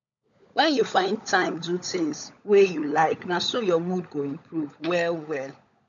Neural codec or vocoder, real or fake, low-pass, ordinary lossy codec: codec, 16 kHz, 16 kbps, FunCodec, trained on LibriTTS, 50 frames a second; fake; 7.2 kHz; none